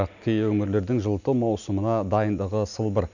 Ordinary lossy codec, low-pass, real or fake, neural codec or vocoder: none; 7.2 kHz; real; none